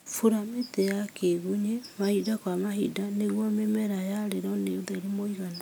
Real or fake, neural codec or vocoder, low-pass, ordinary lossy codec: real; none; none; none